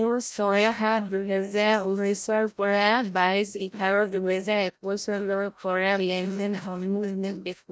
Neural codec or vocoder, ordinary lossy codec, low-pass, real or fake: codec, 16 kHz, 0.5 kbps, FreqCodec, larger model; none; none; fake